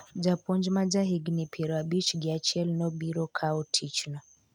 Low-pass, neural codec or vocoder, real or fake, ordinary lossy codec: 19.8 kHz; none; real; MP3, 96 kbps